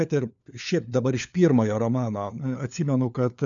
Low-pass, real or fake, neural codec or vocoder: 7.2 kHz; fake; codec, 16 kHz, 4 kbps, FunCodec, trained on LibriTTS, 50 frames a second